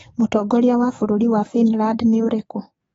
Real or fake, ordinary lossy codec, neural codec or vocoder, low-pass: fake; AAC, 24 kbps; codec, 44.1 kHz, 7.8 kbps, DAC; 19.8 kHz